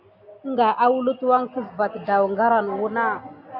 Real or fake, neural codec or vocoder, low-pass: real; none; 5.4 kHz